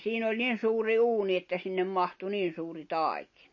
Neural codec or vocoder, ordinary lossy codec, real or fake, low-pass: none; MP3, 32 kbps; real; 7.2 kHz